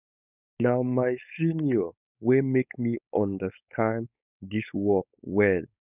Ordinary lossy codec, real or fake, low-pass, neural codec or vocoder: none; fake; 3.6 kHz; codec, 16 kHz, 4.8 kbps, FACodec